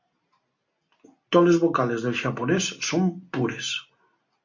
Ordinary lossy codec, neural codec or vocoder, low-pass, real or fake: MP3, 48 kbps; none; 7.2 kHz; real